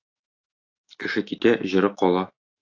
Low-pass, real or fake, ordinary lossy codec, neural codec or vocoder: 7.2 kHz; fake; AAC, 48 kbps; autoencoder, 48 kHz, 128 numbers a frame, DAC-VAE, trained on Japanese speech